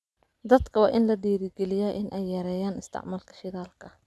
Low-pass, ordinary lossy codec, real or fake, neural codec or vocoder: none; none; real; none